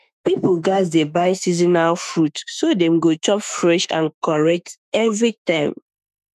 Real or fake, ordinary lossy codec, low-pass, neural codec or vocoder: fake; none; 14.4 kHz; autoencoder, 48 kHz, 32 numbers a frame, DAC-VAE, trained on Japanese speech